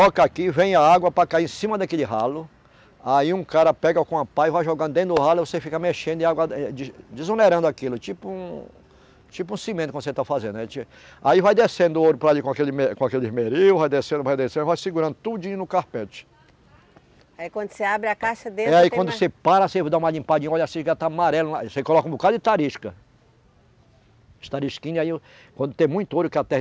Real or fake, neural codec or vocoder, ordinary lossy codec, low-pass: real; none; none; none